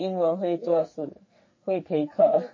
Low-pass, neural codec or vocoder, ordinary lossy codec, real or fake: 7.2 kHz; codec, 44.1 kHz, 2.6 kbps, SNAC; MP3, 32 kbps; fake